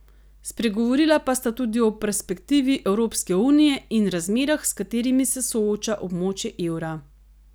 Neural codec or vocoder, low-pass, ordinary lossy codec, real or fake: none; none; none; real